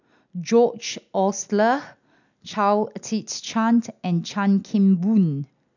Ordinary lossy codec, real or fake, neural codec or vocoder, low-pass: none; real; none; 7.2 kHz